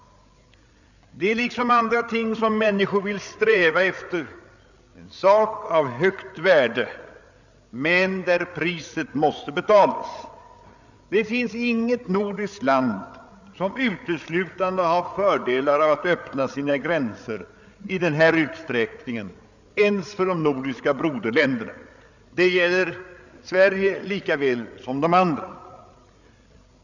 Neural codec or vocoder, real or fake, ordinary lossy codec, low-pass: codec, 16 kHz, 8 kbps, FreqCodec, larger model; fake; none; 7.2 kHz